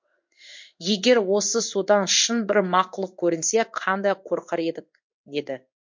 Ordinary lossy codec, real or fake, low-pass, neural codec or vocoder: MP3, 48 kbps; fake; 7.2 kHz; codec, 16 kHz in and 24 kHz out, 1 kbps, XY-Tokenizer